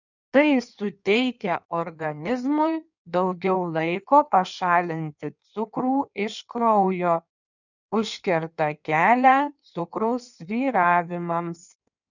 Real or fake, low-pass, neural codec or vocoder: fake; 7.2 kHz; codec, 16 kHz in and 24 kHz out, 1.1 kbps, FireRedTTS-2 codec